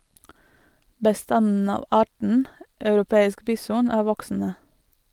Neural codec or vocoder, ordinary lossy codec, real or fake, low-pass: none; Opus, 24 kbps; real; 19.8 kHz